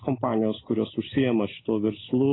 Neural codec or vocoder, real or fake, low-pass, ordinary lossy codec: none; real; 7.2 kHz; AAC, 16 kbps